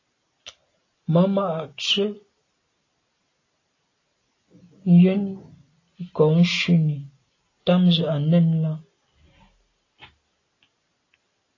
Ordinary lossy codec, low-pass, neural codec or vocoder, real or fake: AAC, 32 kbps; 7.2 kHz; none; real